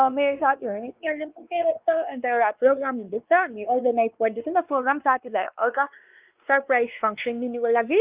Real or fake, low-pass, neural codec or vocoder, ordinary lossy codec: fake; 3.6 kHz; codec, 16 kHz, 2 kbps, X-Codec, WavLM features, trained on Multilingual LibriSpeech; Opus, 32 kbps